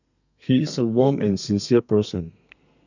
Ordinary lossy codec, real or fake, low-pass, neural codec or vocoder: none; fake; 7.2 kHz; codec, 32 kHz, 1.9 kbps, SNAC